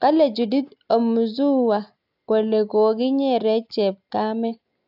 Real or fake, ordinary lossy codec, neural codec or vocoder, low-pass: real; AAC, 48 kbps; none; 5.4 kHz